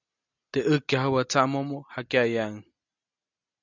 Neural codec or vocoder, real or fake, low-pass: none; real; 7.2 kHz